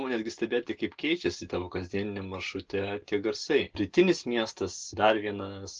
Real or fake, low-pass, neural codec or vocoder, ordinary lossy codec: real; 7.2 kHz; none; Opus, 16 kbps